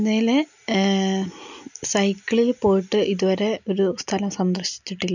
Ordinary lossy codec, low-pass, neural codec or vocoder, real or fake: none; 7.2 kHz; none; real